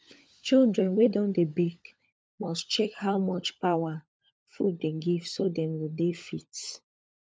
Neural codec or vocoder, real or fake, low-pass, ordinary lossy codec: codec, 16 kHz, 16 kbps, FunCodec, trained on LibriTTS, 50 frames a second; fake; none; none